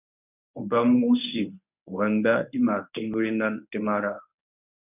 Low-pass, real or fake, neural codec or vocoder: 3.6 kHz; fake; codec, 24 kHz, 0.9 kbps, WavTokenizer, medium speech release version 1